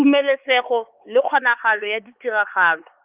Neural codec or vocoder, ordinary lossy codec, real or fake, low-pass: codec, 16 kHz, 4 kbps, X-Codec, HuBERT features, trained on LibriSpeech; Opus, 32 kbps; fake; 3.6 kHz